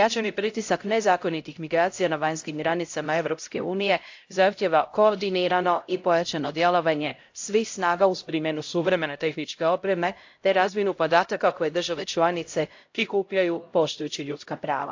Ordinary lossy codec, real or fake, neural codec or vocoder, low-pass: AAC, 48 kbps; fake; codec, 16 kHz, 0.5 kbps, X-Codec, HuBERT features, trained on LibriSpeech; 7.2 kHz